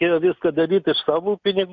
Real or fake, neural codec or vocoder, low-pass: real; none; 7.2 kHz